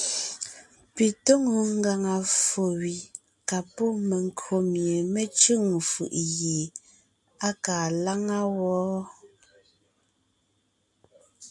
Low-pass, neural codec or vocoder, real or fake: 10.8 kHz; none; real